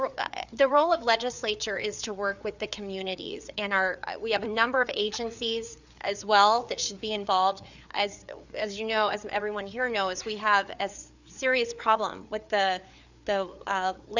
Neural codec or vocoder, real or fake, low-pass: codec, 16 kHz, 4 kbps, FreqCodec, larger model; fake; 7.2 kHz